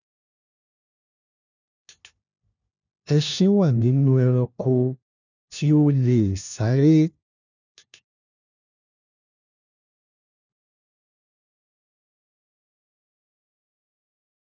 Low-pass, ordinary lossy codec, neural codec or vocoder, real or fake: 7.2 kHz; none; codec, 16 kHz, 1 kbps, FunCodec, trained on LibriTTS, 50 frames a second; fake